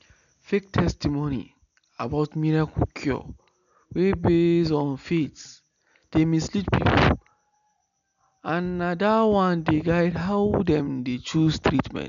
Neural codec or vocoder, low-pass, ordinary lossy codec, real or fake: none; 7.2 kHz; none; real